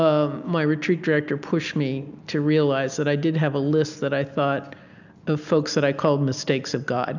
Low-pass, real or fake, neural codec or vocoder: 7.2 kHz; real; none